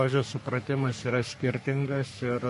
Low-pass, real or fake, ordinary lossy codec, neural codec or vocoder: 14.4 kHz; fake; MP3, 48 kbps; codec, 44.1 kHz, 3.4 kbps, Pupu-Codec